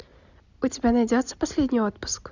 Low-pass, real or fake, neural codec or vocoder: 7.2 kHz; real; none